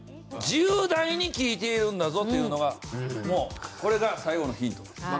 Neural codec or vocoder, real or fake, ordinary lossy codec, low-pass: none; real; none; none